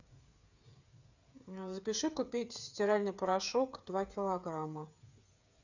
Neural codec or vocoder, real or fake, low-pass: codec, 16 kHz, 8 kbps, FreqCodec, smaller model; fake; 7.2 kHz